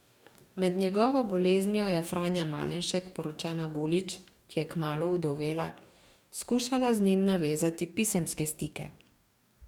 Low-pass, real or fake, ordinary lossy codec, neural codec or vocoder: 19.8 kHz; fake; none; codec, 44.1 kHz, 2.6 kbps, DAC